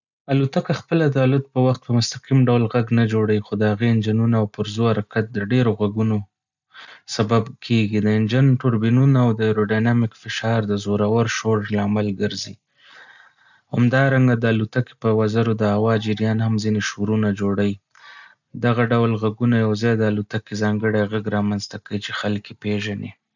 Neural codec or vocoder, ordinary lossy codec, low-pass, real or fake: none; none; 7.2 kHz; real